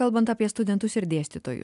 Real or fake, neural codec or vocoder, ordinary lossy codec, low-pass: real; none; MP3, 96 kbps; 10.8 kHz